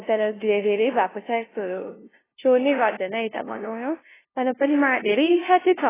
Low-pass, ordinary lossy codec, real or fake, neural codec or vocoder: 3.6 kHz; AAC, 16 kbps; fake; codec, 16 kHz, 0.5 kbps, FunCodec, trained on LibriTTS, 25 frames a second